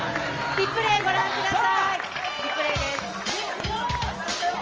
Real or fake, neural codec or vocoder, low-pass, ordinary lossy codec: real; none; 7.2 kHz; Opus, 24 kbps